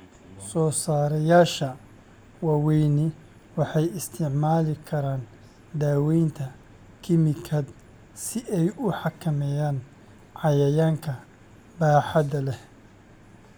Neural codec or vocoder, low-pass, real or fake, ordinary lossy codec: none; none; real; none